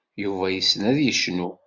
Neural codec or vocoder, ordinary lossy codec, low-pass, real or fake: none; Opus, 64 kbps; 7.2 kHz; real